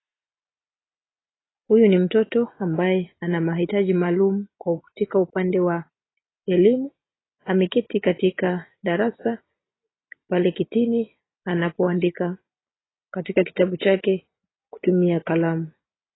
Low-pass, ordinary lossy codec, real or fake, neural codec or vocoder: 7.2 kHz; AAC, 16 kbps; real; none